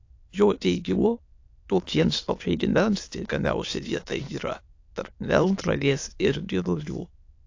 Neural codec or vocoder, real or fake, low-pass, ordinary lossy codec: autoencoder, 22.05 kHz, a latent of 192 numbers a frame, VITS, trained on many speakers; fake; 7.2 kHz; AAC, 48 kbps